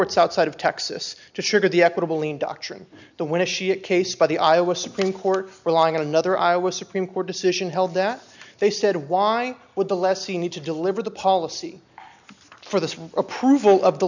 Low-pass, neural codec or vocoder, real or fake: 7.2 kHz; none; real